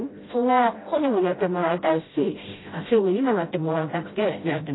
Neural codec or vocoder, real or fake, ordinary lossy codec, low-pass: codec, 16 kHz, 0.5 kbps, FreqCodec, smaller model; fake; AAC, 16 kbps; 7.2 kHz